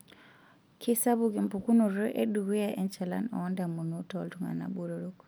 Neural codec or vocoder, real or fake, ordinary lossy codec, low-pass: none; real; none; none